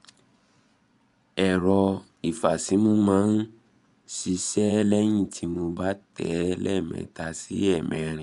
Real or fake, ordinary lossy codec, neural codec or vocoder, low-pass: fake; none; vocoder, 24 kHz, 100 mel bands, Vocos; 10.8 kHz